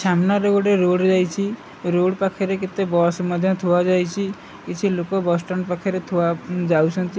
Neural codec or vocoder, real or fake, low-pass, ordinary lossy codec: none; real; none; none